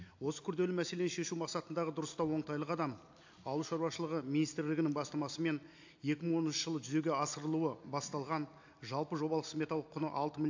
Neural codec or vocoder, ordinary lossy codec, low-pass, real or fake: none; AAC, 48 kbps; 7.2 kHz; real